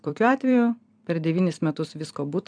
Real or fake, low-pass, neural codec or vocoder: fake; 9.9 kHz; vocoder, 44.1 kHz, 128 mel bands every 256 samples, BigVGAN v2